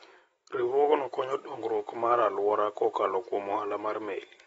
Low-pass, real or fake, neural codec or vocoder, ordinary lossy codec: 19.8 kHz; real; none; AAC, 24 kbps